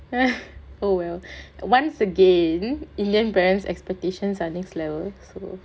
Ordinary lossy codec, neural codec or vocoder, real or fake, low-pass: none; none; real; none